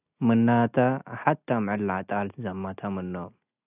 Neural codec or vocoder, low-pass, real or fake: none; 3.6 kHz; real